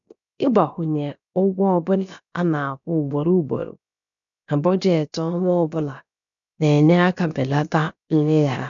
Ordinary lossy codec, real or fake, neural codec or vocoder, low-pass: none; fake; codec, 16 kHz, about 1 kbps, DyCAST, with the encoder's durations; 7.2 kHz